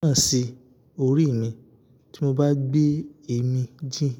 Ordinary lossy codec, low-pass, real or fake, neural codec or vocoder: none; 19.8 kHz; real; none